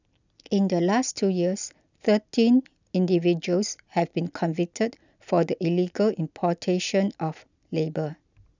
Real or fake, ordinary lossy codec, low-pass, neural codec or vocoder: real; none; 7.2 kHz; none